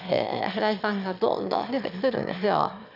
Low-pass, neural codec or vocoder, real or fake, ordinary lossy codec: 5.4 kHz; autoencoder, 22.05 kHz, a latent of 192 numbers a frame, VITS, trained on one speaker; fake; none